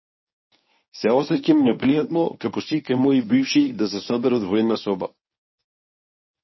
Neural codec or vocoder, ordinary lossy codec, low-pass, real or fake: codec, 24 kHz, 0.9 kbps, WavTokenizer, medium speech release version 1; MP3, 24 kbps; 7.2 kHz; fake